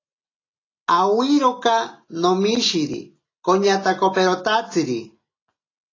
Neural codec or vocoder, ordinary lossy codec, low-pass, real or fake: none; AAC, 32 kbps; 7.2 kHz; real